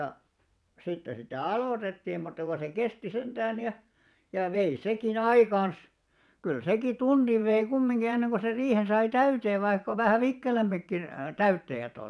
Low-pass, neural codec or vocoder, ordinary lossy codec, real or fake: none; vocoder, 22.05 kHz, 80 mel bands, WaveNeXt; none; fake